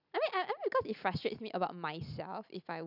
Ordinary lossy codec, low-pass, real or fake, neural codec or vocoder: none; 5.4 kHz; real; none